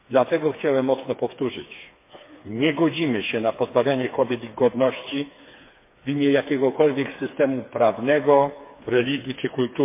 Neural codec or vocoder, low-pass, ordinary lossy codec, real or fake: codec, 16 kHz, 4 kbps, FreqCodec, smaller model; 3.6 kHz; MP3, 24 kbps; fake